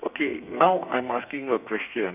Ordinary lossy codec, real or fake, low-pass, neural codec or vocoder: none; fake; 3.6 kHz; codec, 32 kHz, 1.9 kbps, SNAC